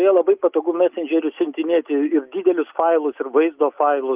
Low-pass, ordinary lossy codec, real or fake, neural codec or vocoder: 3.6 kHz; Opus, 24 kbps; real; none